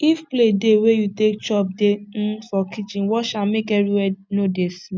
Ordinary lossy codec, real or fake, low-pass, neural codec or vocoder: none; real; none; none